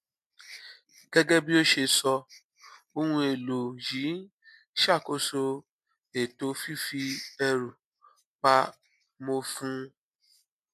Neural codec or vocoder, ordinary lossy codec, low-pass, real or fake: none; MP3, 64 kbps; 14.4 kHz; real